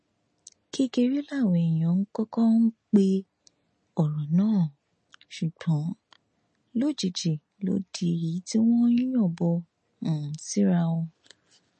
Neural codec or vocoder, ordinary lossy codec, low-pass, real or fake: none; MP3, 32 kbps; 10.8 kHz; real